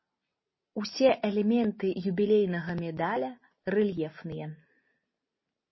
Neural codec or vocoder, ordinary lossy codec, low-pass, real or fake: none; MP3, 24 kbps; 7.2 kHz; real